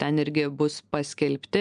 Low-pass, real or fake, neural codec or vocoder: 9.9 kHz; real; none